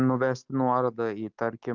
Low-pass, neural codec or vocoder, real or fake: 7.2 kHz; none; real